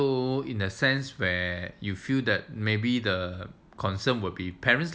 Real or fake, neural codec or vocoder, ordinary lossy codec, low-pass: real; none; none; none